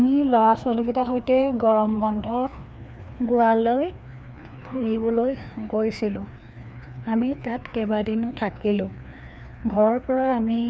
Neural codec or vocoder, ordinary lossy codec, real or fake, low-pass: codec, 16 kHz, 2 kbps, FreqCodec, larger model; none; fake; none